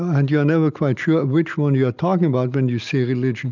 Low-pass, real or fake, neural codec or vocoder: 7.2 kHz; real; none